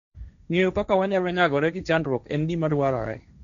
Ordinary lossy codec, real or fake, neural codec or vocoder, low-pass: none; fake; codec, 16 kHz, 1.1 kbps, Voila-Tokenizer; 7.2 kHz